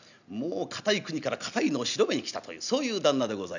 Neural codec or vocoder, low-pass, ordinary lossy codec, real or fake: none; 7.2 kHz; none; real